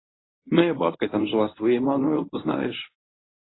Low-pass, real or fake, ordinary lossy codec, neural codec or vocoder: 7.2 kHz; fake; AAC, 16 kbps; codec, 24 kHz, 0.9 kbps, WavTokenizer, medium speech release version 1